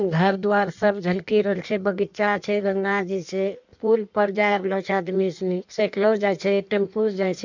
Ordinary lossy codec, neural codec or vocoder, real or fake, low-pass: none; codec, 16 kHz in and 24 kHz out, 1.1 kbps, FireRedTTS-2 codec; fake; 7.2 kHz